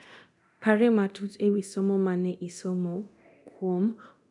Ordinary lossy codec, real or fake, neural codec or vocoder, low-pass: none; fake; codec, 24 kHz, 0.9 kbps, DualCodec; 10.8 kHz